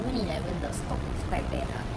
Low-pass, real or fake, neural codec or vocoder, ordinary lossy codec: none; fake; vocoder, 22.05 kHz, 80 mel bands, WaveNeXt; none